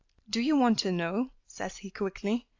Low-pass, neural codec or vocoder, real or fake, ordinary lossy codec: 7.2 kHz; none; real; AAC, 48 kbps